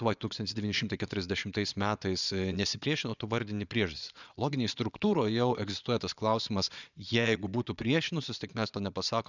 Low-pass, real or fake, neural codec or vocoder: 7.2 kHz; fake; vocoder, 22.05 kHz, 80 mel bands, Vocos